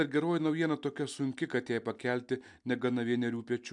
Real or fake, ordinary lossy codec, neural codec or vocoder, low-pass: real; MP3, 96 kbps; none; 10.8 kHz